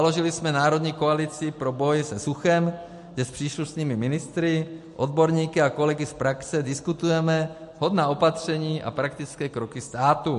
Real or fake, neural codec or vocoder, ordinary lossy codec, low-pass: real; none; MP3, 48 kbps; 14.4 kHz